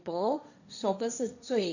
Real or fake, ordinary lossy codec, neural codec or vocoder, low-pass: fake; none; codec, 16 kHz, 1.1 kbps, Voila-Tokenizer; 7.2 kHz